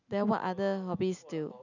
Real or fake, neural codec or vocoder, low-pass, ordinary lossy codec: real; none; 7.2 kHz; none